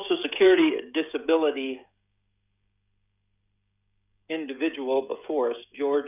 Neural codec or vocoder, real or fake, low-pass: codec, 16 kHz, 16 kbps, FreqCodec, smaller model; fake; 3.6 kHz